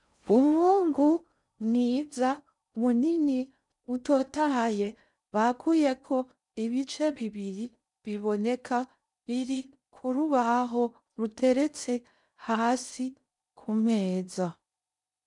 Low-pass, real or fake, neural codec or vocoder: 10.8 kHz; fake; codec, 16 kHz in and 24 kHz out, 0.6 kbps, FocalCodec, streaming, 4096 codes